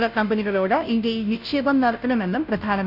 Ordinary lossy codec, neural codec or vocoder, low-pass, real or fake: none; codec, 16 kHz, 0.5 kbps, FunCodec, trained on Chinese and English, 25 frames a second; 5.4 kHz; fake